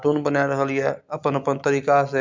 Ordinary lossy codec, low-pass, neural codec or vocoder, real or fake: MP3, 64 kbps; 7.2 kHz; none; real